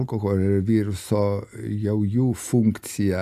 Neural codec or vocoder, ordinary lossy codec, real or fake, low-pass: none; Opus, 64 kbps; real; 14.4 kHz